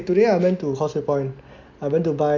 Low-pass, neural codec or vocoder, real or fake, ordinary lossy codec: 7.2 kHz; none; real; MP3, 64 kbps